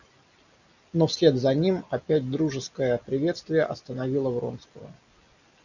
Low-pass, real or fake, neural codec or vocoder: 7.2 kHz; real; none